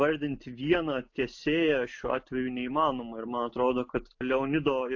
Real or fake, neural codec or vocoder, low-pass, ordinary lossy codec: real; none; 7.2 kHz; AAC, 48 kbps